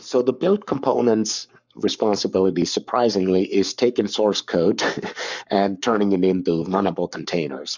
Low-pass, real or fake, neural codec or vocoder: 7.2 kHz; fake; codec, 44.1 kHz, 7.8 kbps, Pupu-Codec